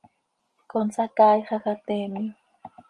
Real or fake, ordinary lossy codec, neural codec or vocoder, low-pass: real; Opus, 32 kbps; none; 10.8 kHz